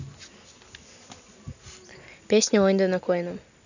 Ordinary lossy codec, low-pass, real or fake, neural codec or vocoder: none; 7.2 kHz; real; none